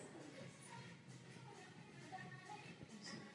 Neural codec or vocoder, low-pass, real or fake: none; 10.8 kHz; real